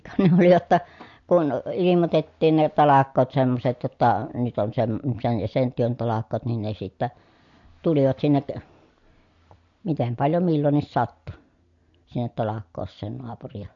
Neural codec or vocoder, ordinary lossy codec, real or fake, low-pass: none; MP3, 48 kbps; real; 7.2 kHz